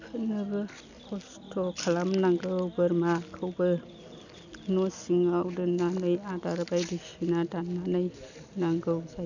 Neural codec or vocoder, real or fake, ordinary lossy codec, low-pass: none; real; none; 7.2 kHz